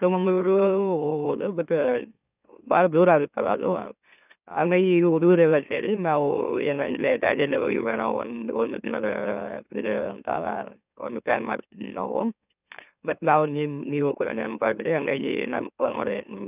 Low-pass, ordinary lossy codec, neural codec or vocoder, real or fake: 3.6 kHz; none; autoencoder, 44.1 kHz, a latent of 192 numbers a frame, MeloTTS; fake